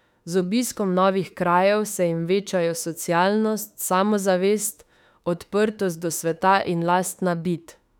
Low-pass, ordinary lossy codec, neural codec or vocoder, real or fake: 19.8 kHz; none; autoencoder, 48 kHz, 32 numbers a frame, DAC-VAE, trained on Japanese speech; fake